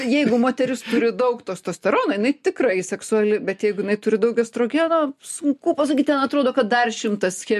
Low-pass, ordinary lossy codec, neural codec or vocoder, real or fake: 14.4 kHz; MP3, 64 kbps; none; real